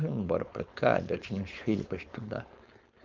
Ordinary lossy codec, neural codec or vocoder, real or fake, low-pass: Opus, 32 kbps; codec, 16 kHz, 4.8 kbps, FACodec; fake; 7.2 kHz